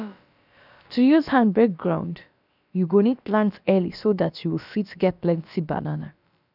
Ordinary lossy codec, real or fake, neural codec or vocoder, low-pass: none; fake; codec, 16 kHz, about 1 kbps, DyCAST, with the encoder's durations; 5.4 kHz